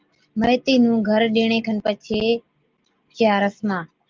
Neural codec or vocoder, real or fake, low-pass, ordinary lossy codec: none; real; 7.2 kHz; Opus, 24 kbps